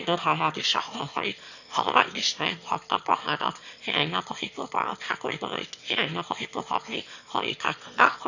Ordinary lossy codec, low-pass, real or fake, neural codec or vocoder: none; 7.2 kHz; fake; autoencoder, 22.05 kHz, a latent of 192 numbers a frame, VITS, trained on one speaker